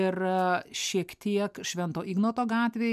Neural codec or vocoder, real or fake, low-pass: none; real; 14.4 kHz